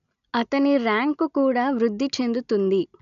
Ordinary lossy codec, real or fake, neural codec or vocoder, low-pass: none; real; none; 7.2 kHz